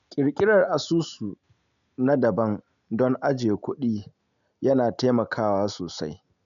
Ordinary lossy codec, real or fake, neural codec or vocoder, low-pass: none; real; none; 7.2 kHz